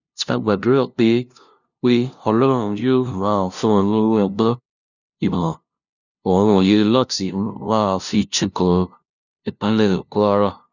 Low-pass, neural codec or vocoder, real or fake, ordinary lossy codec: 7.2 kHz; codec, 16 kHz, 0.5 kbps, FunCodec, trained on LibriTTS, 25 frames a second; fake; none